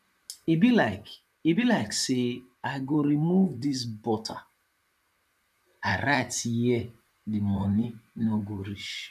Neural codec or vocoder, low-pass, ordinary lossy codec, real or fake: vocoder, 44.1 kHz, 128 mel bands, Pupu-Vocoder; 14.4 kHz; none; fake